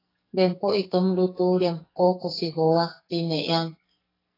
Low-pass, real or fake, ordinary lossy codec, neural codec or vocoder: 5.4 kHz; fake; AAC, 24 kbps; codec, 44.1 kHz, 2.6 kbps, SNAC